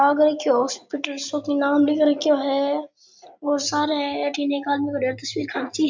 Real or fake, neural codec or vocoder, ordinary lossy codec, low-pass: real; none; MP3, 64 kbps; 7.2 kHz